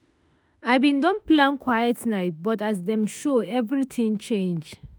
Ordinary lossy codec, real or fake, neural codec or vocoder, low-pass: none; fake; autoencoder, 48 kHz, 32 numbers a frame, DAC-VAE, trained on Japanese speech; none